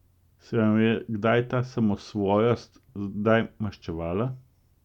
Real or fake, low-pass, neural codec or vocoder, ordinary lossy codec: real; 19.8 kHz; none; none